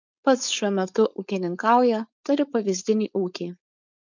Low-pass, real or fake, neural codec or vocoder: 7.2 kHz; fake; codec, 16 kHz, 4.8 kbps, FACodec